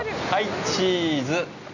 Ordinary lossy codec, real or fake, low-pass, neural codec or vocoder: none; real; 7.2 kHz; none